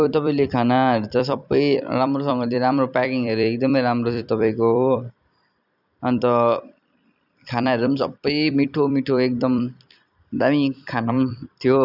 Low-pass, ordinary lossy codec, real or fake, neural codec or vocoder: 5.4 kHz; none; fake; vocoder, 44.1 kHz, 128 mel bands every 256 samples, BigVGAN v2